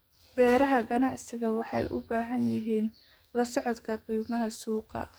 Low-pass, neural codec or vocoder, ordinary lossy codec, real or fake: none; codec, 44.1 kHz, 2.6 kbps, SNAC; none; fake